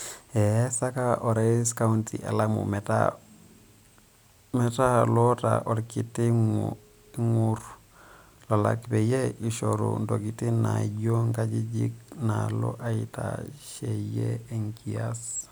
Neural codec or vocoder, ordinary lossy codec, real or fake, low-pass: none; none; real; none